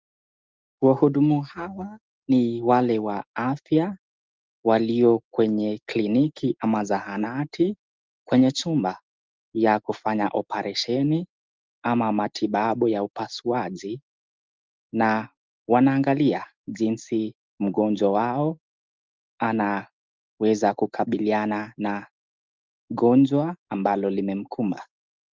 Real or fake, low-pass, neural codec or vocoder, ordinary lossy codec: real; 7.2 kHz; none; Opus, 32 kbps